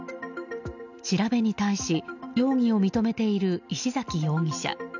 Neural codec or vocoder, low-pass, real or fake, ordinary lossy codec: none; 7.2 kHz; real; none